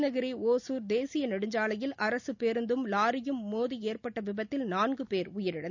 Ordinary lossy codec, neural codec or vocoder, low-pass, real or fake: none; none; 7.2 kHz; real